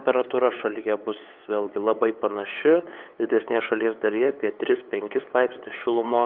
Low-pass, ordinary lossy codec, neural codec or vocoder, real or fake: 5.4 kHz; Opus, 24 kbps; codec, 16 kHz, 8 kbps, FunCodec, trained on LibriTTS, 25 frames a second; fake